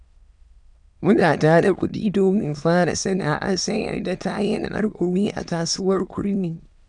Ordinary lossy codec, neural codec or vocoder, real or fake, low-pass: none; autoencoder, 22.05 kHz, a latent of 192 numbers a frame, VITS, trained on many speakers; fake; 9.9 kHz